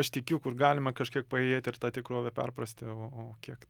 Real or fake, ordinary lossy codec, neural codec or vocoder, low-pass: real; Opus, 32 kbps; none; 19.8 kHz